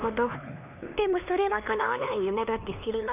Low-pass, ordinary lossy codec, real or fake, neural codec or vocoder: 3.6 kHz; none; fake; codec, 16 kHz, 2 kbps, X-Codec, HuBERT features, trained on LibriSpeech